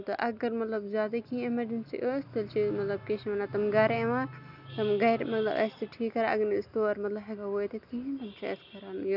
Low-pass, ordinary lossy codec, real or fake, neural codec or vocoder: 5.4 kHz; none; real; none